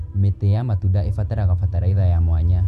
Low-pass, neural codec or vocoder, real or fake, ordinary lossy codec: 14.4 kHz; none; real; none